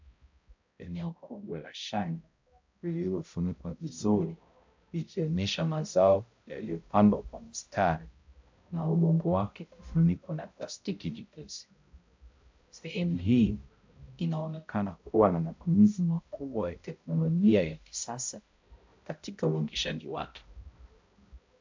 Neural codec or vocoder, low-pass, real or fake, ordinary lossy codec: codec, 16 kHz, 0.5 kbps, X-Codec, HuBERT features, trained on balanced general audio; 7.2 kHz; fake; MP3, 64 kbps